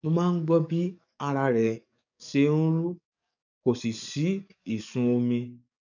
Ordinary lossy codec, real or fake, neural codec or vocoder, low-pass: none; fake; codec, 44.1 kHz, 7.8 kbps, DAC; 7.2 kHz